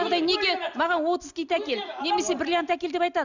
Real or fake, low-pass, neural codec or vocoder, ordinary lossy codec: real; 7.2 kHz; none; none